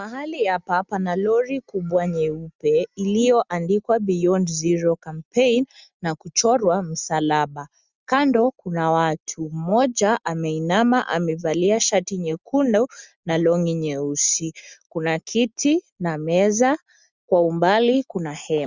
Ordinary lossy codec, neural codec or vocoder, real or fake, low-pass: Opus, 64 kbps; none; real; 7.2 kHz